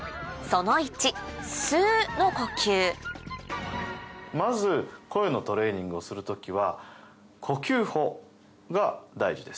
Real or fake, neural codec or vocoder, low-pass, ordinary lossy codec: real; none; none; none